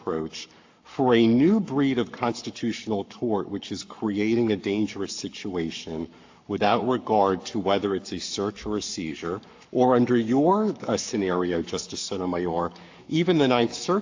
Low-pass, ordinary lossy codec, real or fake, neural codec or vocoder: 7.2 kHz; AAC, 48 kbps; fake; codec, 44.1 kHz, 7.8 kbps, Pupu-Codec